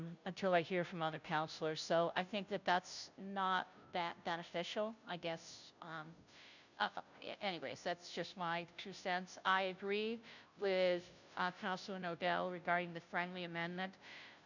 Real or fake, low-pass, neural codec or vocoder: fake; 7.2 kHz; codec, 16 kHz, 0.5 kbps, FunCodec, trained on Chinese and English, 25 frames a second